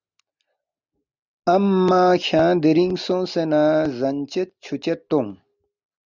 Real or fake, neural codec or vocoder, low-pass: real; none; 7.2 kHz